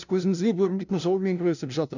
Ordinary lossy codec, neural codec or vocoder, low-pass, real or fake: none; codec, 16 kHz, 0.5 kbps, FunCodec, trained on LibriTTS, 25 frames a second; 7.2 kHz; fake